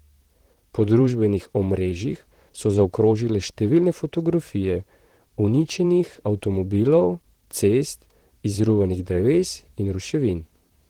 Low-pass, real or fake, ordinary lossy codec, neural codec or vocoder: 19.8 kHz; fake; Opus, 16 kbps; vocoder, 44.1 kHz, 128 mel bands, Pupu-Vocoder